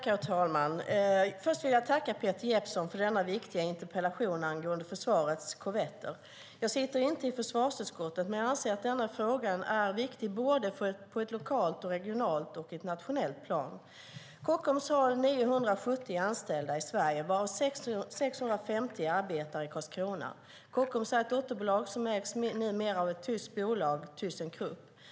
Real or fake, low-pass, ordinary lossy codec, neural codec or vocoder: real; none; none; none